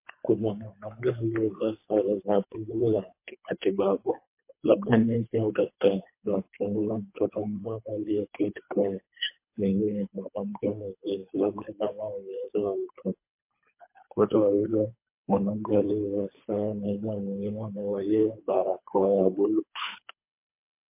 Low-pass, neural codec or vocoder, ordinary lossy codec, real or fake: 3.6 kHz; codec, 24 kHz, 3 kbps, HILCodec; MP3, 24 kbps; fake